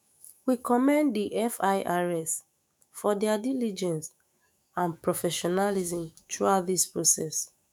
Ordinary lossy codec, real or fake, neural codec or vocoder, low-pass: none; fake; autoencoder, 48 kHz, 128 numbers a frame, DAC-VAE, trained on Japanese speech; none